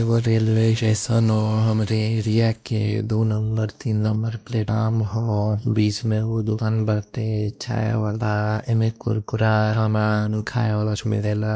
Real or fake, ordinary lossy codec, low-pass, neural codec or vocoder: fake; none; none; codec, 16 kHz, 1 kbps, X-Codec, WavLM features, trained on Multilingual LibriSpeech